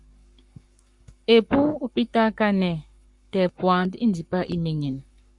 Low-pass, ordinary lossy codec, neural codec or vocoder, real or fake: 10.8 kHz; Opus, 64 kbps; codec, 44.1 kHz, 7.8 kbps, Pupu-Codec; fake